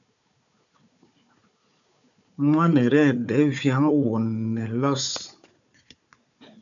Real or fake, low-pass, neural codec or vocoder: fake; 7.2 kHz; codec, 16 kHz, 4 kbps, FunCodec, trained on Chinese and English, 50 frames a second